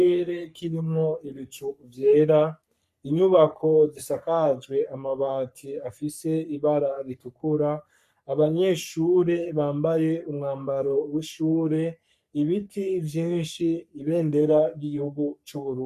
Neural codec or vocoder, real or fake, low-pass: codec, 44.1 kHz, 3.4 kbps, Pupu-Codec; fake; 14.4 kHz